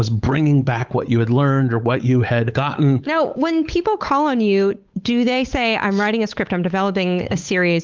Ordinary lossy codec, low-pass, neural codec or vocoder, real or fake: Opus, 32 kbps; 7.2 kHz; codec, 16 kHz, 8 kbps, FunCodec, trained on Chinese and English, 25 frames a second; fake